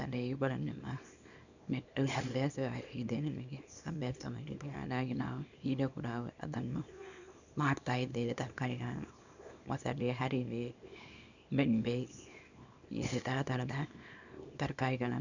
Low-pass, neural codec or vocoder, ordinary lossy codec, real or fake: 7.2 kHz; codec, 24 kHz, 0.9 kbps, WavTokenizer, small release; none; fake